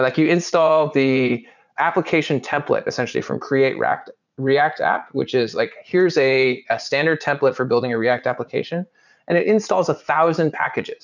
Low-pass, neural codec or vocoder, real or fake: 7.2 kHz; vocoder, 44.1 kHz, 80 mel bands, Vocos; fake